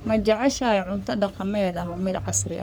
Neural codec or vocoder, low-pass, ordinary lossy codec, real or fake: codec, 44.1 kHz, 3.4 kbps, Pupu-Codec; none; none; fake